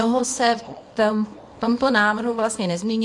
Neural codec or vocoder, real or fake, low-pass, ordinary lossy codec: codec, 24 kHz, 0.9 kbps, WavTokenizer, small release; fake; 10.8 kHz; AAC, 64 kbps